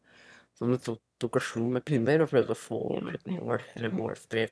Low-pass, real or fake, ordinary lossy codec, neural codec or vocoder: none; fake; none; autoencoder, 22.05 kHz, a latent of 192 numbers a frame, VITS, trained on one speaker